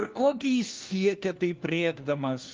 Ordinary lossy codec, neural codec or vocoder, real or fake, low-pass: Opus, 32 kbps; codec, 16 kHz, 1 kbps, FunCodec, trained on LibriTTS, 50 frames a second; fake; 7.2 kHz